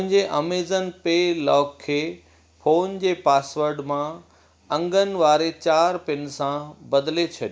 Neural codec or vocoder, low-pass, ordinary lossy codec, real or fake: none; none; none; real